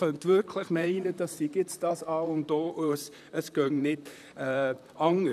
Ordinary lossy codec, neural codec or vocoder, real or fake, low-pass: none; vocoder, 44.1 kHz, 128 mel bands, Pupu-Vocoder; fake; 14.4 kHz